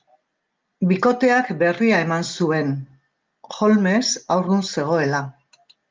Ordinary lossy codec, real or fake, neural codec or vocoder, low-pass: Opus, 32 kbps; real; none; 7.2 kHz